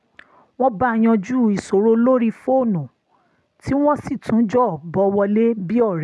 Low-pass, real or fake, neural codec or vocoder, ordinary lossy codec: none; real; none; none